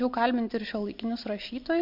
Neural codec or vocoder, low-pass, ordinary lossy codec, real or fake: none; 5.4 kHz; MP3, 48 kbps; real